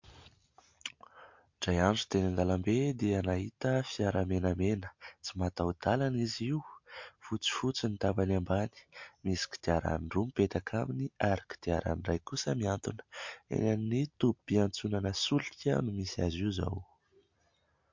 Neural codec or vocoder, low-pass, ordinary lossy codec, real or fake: none; 7.2 kHz; MP3, 48 kbps; real